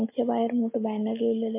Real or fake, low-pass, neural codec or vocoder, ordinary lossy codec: real; 3.6 kHz; none; MP3, 24 kbps